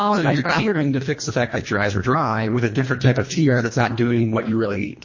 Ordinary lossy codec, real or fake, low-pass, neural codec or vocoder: MP3, 32 kbps; fake; 7.2 kHz; codec, 24 kHz, 1.5 kbps, HILCodec